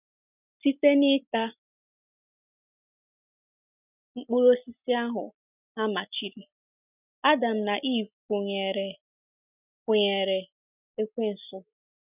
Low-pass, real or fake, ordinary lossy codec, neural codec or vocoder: 3.6 kHz; real; none; none